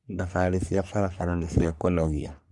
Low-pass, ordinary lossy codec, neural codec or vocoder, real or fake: 10.8 kHz; Opus, 64 kbps; codec, 44.1 kHz, 3.4 kbps, Pupu-Codec; fake